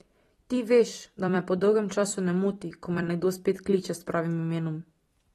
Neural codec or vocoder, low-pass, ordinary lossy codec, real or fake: vocoder, 44.1 kHz, 128 mel bands every 256 samples, BigVGAN v2; 19.8 kHz; AAC, 32 kbps; fake